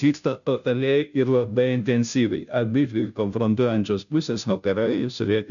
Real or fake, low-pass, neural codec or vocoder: fake; 7.2 kHz; codec, 16 kHz, 0.5 kbps, FunCodec, trained on Chinese and English, 25 frames a second